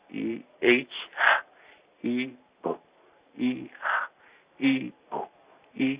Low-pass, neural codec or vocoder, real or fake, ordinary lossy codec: 3.6 kHz; none; real; Opus, 64 kbps